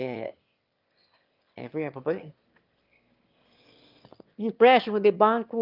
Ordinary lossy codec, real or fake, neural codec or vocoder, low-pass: Opus, 24 kbps; fake; autoencoder, 22.05 kHz, a latent of 192 numbers a frame, VITS, trained on one speaker; 5.4 kHz